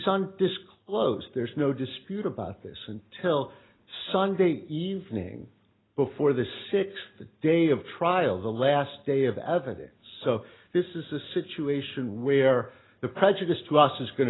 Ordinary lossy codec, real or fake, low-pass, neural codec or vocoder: AAC, 16 kbps; real; 7.2 kHz; none